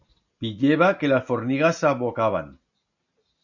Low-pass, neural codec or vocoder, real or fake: 7.2 kHz; none; real